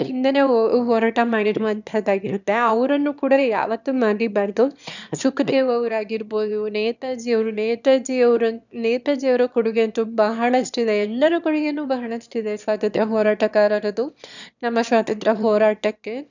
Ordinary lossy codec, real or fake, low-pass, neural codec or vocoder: none; fake; 7.2 kHz; autoencoder, 22.05 kHz, a latent of 192 numbers a frame, VITS, trained on one speaker